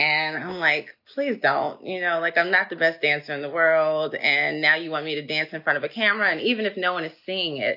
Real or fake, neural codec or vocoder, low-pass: real; none; 5.4 kHz